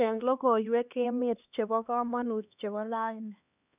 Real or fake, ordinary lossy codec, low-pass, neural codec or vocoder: fake; none; 3.6 kHz; codec, 16 kHz, 2 kbps, X-Codec, HuBERT features, trained on LibriSpeech